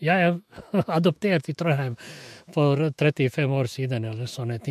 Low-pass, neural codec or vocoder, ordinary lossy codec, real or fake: 14.4 kHz; autoencoder, 48 kHz, 128 numbers a frame, DAC-VAE, trained on Japanese speech; MP3, 64 kbps; fake